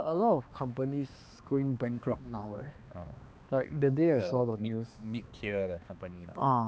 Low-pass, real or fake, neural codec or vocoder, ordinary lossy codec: none; fake; codec, 16 kHz, 2 kbps, X-Codec, HuBERT features, trained on balanced general audio; none